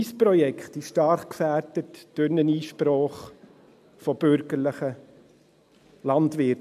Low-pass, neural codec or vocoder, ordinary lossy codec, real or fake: 14.4 kHz; none; MP3, 96 kbps; real